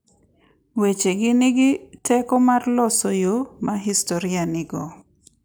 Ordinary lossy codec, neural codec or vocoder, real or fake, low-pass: none; none; real; none